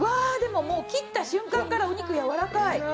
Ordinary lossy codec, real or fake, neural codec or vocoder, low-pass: none; real; none; none